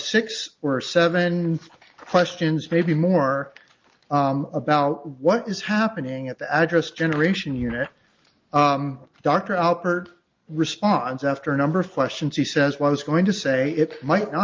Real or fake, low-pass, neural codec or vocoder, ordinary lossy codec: real; 7.2 kHz; none; Opus, 32 kbps